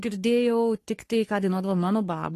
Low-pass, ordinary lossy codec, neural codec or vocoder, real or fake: 14.4 kHz; AAC, 48 kbps; codec, 44.1 kHz, 3.4 kbps, Pupu-Codec; fake